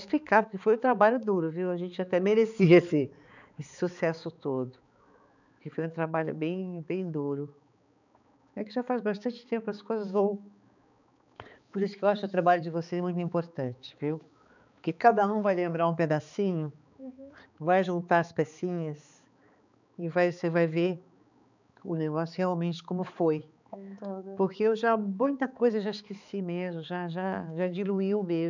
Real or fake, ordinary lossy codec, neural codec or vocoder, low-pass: fake; none; codec, 16 kHz, 4 kbps, X-Codec, HuBERT features, trained on balanced general audio; 7.2 kHz